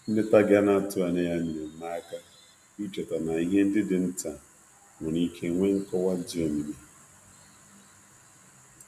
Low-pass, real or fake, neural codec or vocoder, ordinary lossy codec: 14.4 kHz; real; none; none